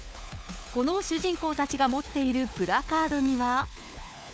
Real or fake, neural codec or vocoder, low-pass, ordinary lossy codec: fake; codec, 16 kHz, 4 kbps, FunCodec, trained on LibriTTS, 50 frames a second; none; none